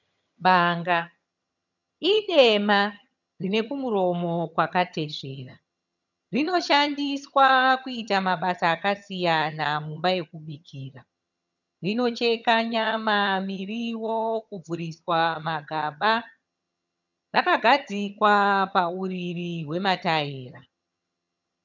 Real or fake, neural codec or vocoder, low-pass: fake; vocoder, 22.05 kHz, 80 mel bands, HiFi-GAN; 7.2 kHz